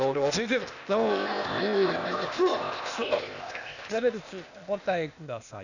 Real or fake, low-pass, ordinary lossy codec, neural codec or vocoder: fake; 7.2 kHz; none; codec, 16 kHz, 0.8 kbps, ZipCodec